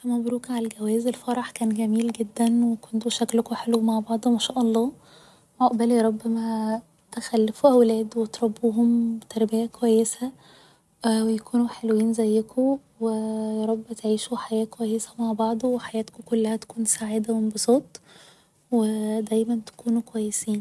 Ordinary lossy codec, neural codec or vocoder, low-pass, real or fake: none; none; none; real